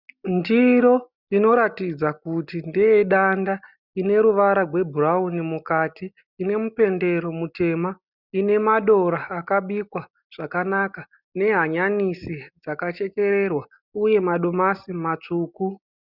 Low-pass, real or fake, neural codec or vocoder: 5.4 kHz; real; none